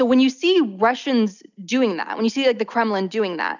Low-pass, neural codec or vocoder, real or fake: 7.2 kHz; none; real